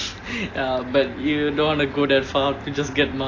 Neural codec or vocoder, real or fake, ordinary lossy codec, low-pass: none; real; AAC, 32 kbps; 7.2 kHz